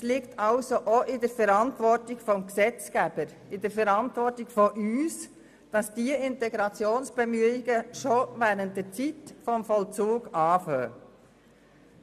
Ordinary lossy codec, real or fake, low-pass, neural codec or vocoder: none; real; 14.4 kHz; none